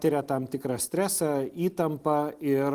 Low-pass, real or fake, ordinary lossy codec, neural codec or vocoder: 14.4 kHz; real; Opus, 24 kbps; none